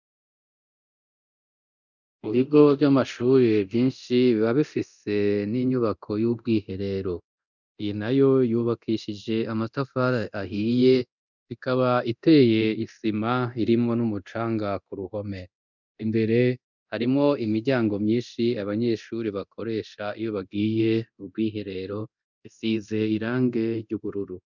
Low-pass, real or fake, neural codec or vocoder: 7.2 kHz; fake; codec, 24 kHz, 0.9 kbps, DualCodec